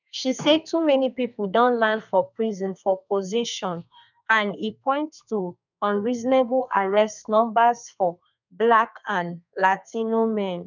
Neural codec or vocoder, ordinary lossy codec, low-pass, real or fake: codec, 32 kHz, 1.9 kbps, SNAC; none; 7.2 kHz; fake